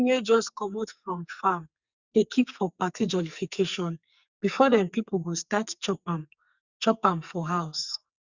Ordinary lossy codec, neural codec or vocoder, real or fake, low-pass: Opus, 64 kbps; codec, 44.1 kHz, 2.6 kbps, SNAC; fake; 7.2 kHz